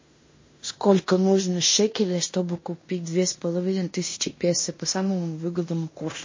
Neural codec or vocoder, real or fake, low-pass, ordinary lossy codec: codec, 16 kHz in and 24 kHz out, 0.9 kbps, LongCat-Audio-Codec, fine tuned four codebook decoder; fake; 7.2 kHz; MP3, 32 kbps